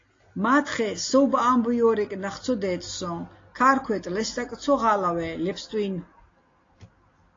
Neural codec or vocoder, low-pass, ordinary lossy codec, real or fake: none; 7.2 kHz; AAC, 32 kbps; real